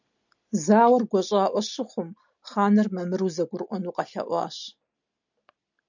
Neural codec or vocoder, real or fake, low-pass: none; real; 7.2 kHz